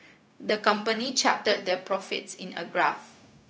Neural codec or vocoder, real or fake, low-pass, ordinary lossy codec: codec, 16 kHz, 0.4 kbps, LongCat-Audio-Codec; fake; none; none